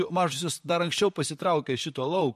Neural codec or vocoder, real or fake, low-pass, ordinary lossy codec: vocoder, 44.1 kHz, 128 mel bands, Pupu-Vocoder; fake; 14.4 kHz; MP3, 64 kbps